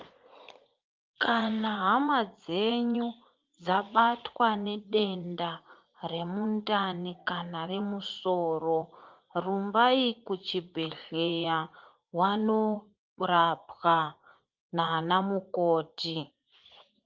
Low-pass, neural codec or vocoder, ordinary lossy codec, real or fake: 7.2 kHz; vocoder, 44.1 kHz, 80 mel bands, Vocos; Opus, 24 kbps; fake